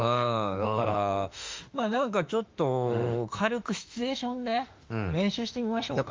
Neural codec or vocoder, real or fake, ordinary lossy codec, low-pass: autoencoder, 48 kHz, 32 numbers a frame, DAC-VAE, trained on Japanese speech; fake; Opus, 32 kbps; 7.2 kHz